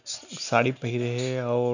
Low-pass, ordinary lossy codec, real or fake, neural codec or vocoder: 7.2 kHz; none; real; none